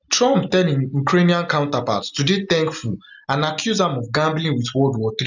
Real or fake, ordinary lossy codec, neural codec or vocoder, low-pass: real; none; none; 7.2 kHz